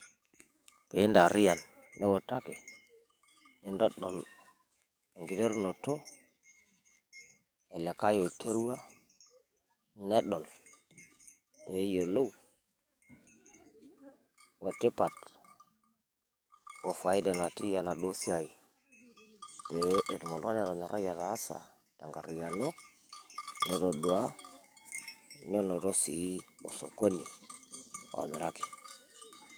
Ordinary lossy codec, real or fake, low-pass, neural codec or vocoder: none; fake; none; codec, 44.1 kHz, 7.8 kbps, DAC